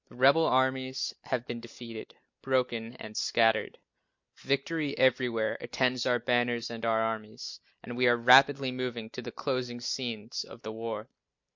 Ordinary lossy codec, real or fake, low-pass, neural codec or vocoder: MP3, 48 kbps; real; 7.2 kHz; none